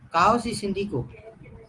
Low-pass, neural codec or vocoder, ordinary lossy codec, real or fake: 10.8 kHz; none; Opus, 24 kbps; real